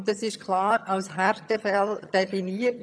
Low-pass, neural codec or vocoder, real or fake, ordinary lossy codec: none; vocoder, 22.05 kHz, 80 mel bands, HiFi-GAN; fake; none